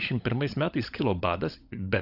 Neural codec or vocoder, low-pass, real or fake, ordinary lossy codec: codec, 16 kHz, 16 kbps, FunCodec, trained on LibriTTS, 50 frames a second; 5.4 kHz; fake; AAC, 48 kbps